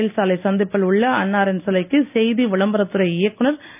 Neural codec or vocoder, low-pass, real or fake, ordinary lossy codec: none; 3.6 kHz; real; none